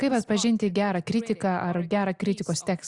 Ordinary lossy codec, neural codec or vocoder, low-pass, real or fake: Opus, 64 kbps; none; 10.8 kHz; real